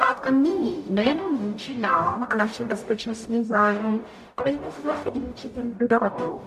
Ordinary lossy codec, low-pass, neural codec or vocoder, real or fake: AAC, 96 kbps; 14.4 kHz; codec, 44.1 kHz, 0.9 kbps, DAC; fake